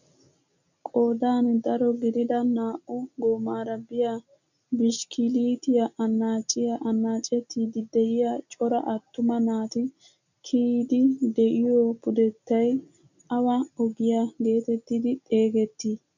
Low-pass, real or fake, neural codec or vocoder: 7.2 kHz; real; none